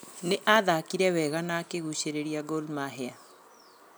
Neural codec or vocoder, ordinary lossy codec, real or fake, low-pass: none; none; real; none